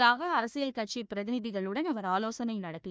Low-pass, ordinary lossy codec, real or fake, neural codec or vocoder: none; none; fake; codec, 16 kHz, 1 kbps, FunCodec, trained on Chinese and English, 50 frames a second